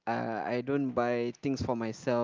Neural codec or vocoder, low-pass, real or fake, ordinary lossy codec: none; 7.2 kHz; real; Opus, 32 kbps